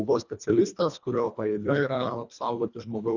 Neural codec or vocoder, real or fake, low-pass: codec, 24 kHz, 1.5 kbps, HILCodec; fake; 7.2 kHz